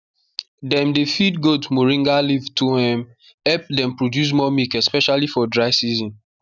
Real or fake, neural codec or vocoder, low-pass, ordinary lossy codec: real; none; 7.2 kHz; none